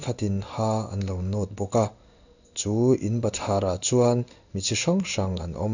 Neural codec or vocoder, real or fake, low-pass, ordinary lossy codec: codec, 16 kHz in and 24 kHz out, 1 kbps, XY-Tokenizer; fake; 7.2 kHz; none